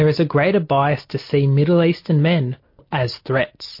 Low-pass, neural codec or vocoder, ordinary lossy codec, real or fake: 5.4 kHz; none; MP3, 32 kbps; real